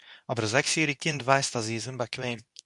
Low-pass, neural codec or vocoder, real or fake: 10.8 kHz; codec, 24 kHz, 0.9 kbps, WavTokenizer, medium speech release version 2; fake